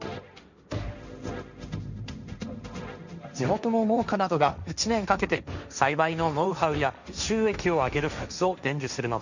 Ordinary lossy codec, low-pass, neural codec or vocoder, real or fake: none; 7.2 kHz; codec, 16 kHz, 1.1 kbps, Voila-Tokenizer; fake